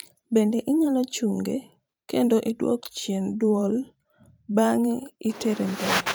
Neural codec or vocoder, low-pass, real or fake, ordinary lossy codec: vocoder, 44.1 kHz, 128 mel bands every 512 samples, BigVGAN v2; none; fake; none